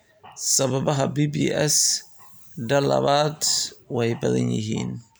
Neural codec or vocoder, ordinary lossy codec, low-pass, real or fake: none; none; none; real